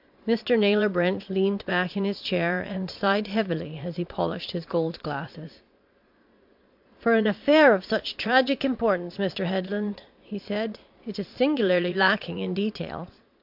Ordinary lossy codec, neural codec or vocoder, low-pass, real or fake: MP3, 48 kbps; vocoder, 22.05 kHz, 80 mel bands, Vocos; 5.4 kHz; fake